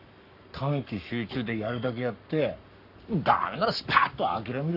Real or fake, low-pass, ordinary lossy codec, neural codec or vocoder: fake; 5.4 kHz; none; codec, 44.1 kHz, 7.8 kbps, Pupu-Codec